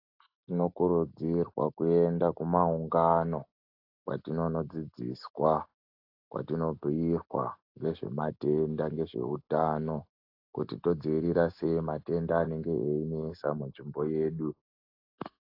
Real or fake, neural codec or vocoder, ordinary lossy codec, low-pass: real; none; AAC, 32 kbps; 5.4 kHz